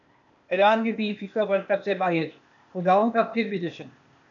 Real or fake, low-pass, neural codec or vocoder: fake; 7.2 kHz; codec, 16 kHz, 0.8 kbps, ZipCodec